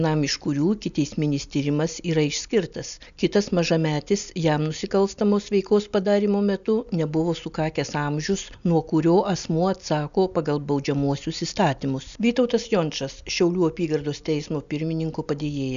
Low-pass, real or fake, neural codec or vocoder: 7.2 kHz; real; none